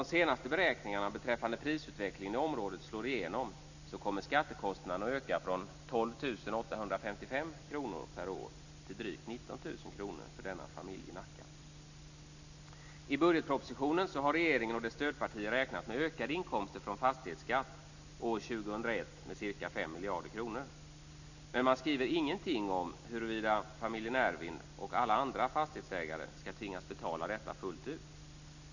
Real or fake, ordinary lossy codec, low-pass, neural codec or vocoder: real; none; 7.2 kHz; none